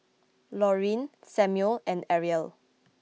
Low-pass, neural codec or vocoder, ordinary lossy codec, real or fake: none; none; none; real